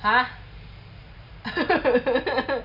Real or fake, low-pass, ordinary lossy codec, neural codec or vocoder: real; 5.4 kHz; none; none